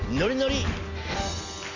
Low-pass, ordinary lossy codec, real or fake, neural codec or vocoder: 7.2 kHz; none; real; none